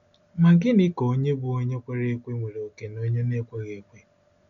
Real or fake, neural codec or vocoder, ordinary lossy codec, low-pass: real; none; none; 7.2 kHz